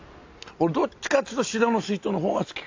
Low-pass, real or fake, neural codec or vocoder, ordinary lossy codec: 7.2 kHz; real; none; AAC, 48 kbps